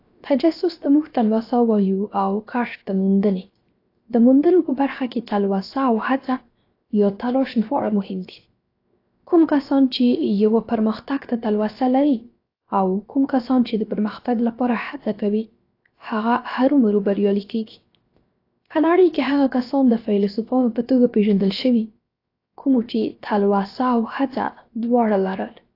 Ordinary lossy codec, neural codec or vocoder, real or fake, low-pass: AAC, 32 kbps; codec, 16 kHz, 0.7 kbps, FocalCodec; fake; 5.4 kHz